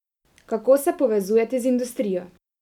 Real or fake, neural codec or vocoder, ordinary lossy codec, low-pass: real; none; none; 19.8 kHz